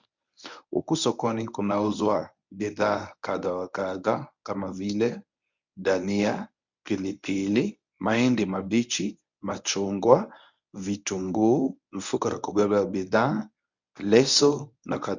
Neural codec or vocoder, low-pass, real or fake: codec, 24 kHz, 0.9 kbps, WavTokenizer, medium speech release version 1; 7.2 kHz; fake